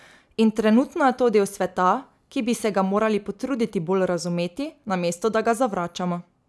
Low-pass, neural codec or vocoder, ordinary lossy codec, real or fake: none; none; none; real